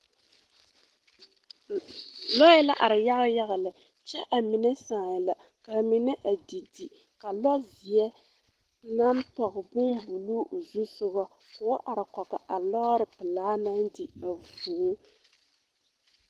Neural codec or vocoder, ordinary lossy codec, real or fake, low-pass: none; Opus, 16 kbps; real; 14.4 kHz